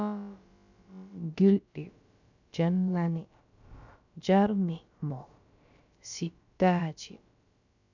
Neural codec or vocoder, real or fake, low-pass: codec, 16 kHz, about 1 kbps, DyCAST, with the encoder's durations; fake; 7.2 kHz